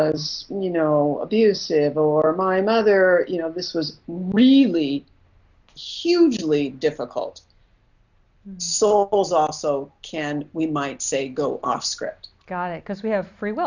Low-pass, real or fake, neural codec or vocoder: 7.2 kHz; real; none